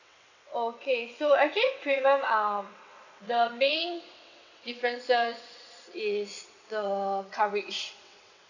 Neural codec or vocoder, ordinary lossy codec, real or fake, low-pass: vocoder, 22.05 kHz, 80 mel bands, WaveNeXt; none; fake; 7.2 kHz